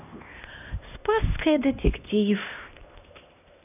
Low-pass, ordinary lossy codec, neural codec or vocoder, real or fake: 3.6 kHz; none; codec, 16 kHz, 1 kbps, X-Codec, HuBERT features, trained on LibriSpeech; fake